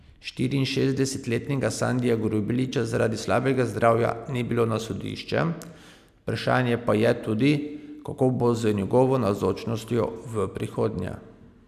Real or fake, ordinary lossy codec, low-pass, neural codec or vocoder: real; none; 14.4 kHz; none